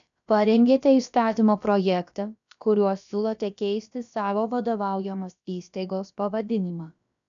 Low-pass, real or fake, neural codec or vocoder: 7.2 kHz; fake; codec, 16 kHz, about 1 kbps, DyCAST, with the encoder's durations